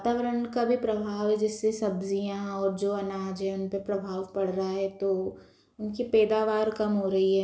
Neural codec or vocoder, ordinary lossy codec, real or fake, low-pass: none; none; real; none